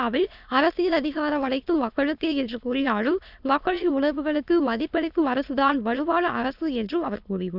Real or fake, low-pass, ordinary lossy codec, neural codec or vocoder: fake; 5.4 kHz; none; autoencoder, 22.05 kHz, a latent of 192 numbers a frame, VITS, trained on many speakers